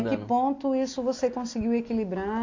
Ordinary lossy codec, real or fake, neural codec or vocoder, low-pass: AAC, 48 kbps; real; none; 7.2 kHz